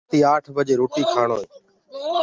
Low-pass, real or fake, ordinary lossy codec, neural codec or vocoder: 7.2 kHz; real; Opus, 32 kbps; none